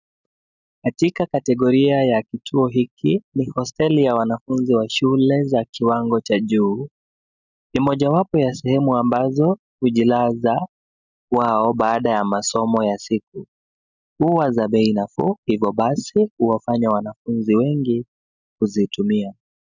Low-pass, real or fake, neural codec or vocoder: 7.2 kHz; real; none